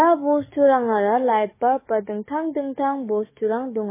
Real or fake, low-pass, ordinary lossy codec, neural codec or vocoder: fake; 3.6 kHz; MP3, 16 kbps; codec, 44.1 kHz, 7.8 kbps, DAC